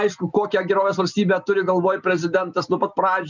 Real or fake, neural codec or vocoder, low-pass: real; none; 7.2 kHz